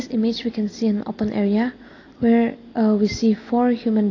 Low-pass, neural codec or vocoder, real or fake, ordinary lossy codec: 7.2 kHz; none; real; AAC, 32 kbps